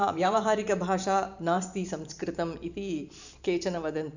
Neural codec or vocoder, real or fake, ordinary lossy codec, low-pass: vocoder, 44.1 kHz, 128 mel bands every 512 samples, BigVGAN v2; fake; none; 7.2 kHz